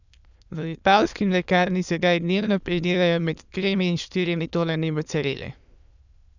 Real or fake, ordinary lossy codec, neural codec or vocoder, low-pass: fake; none; autoencoder, 22.05 kHz, a latent of 192 numbers a frame, VITS, trained on many speakers; 7.2 kHz